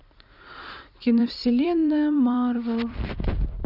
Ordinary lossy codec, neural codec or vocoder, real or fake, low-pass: none; vocoder, 44.1 kHz, 128 mel bands every 256 samples, BigVGAN v2; fake; 5.4 kHz